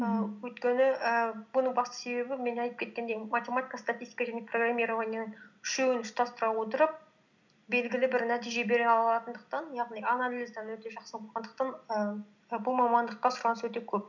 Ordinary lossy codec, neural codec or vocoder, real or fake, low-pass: none; none; real; 7.2 kHz